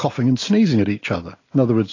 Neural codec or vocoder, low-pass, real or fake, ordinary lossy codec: none; 7.2 kHz; real; AAC, 32 kbps